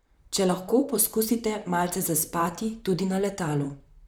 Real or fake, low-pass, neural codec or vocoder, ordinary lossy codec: fake; none; vocoder, 44.1 kHz, 128 mel bands, Pupu-Vocoder; none